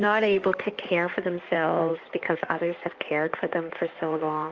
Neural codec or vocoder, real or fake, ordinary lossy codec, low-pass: codec, 16 kHz in and 24 kHz out, 2.2 kbps, FireRedTTS-2 codec; fake; Opus, 24 kbps; 7.2 kHz